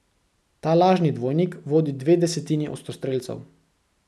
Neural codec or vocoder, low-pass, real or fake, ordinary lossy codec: none; none; real; none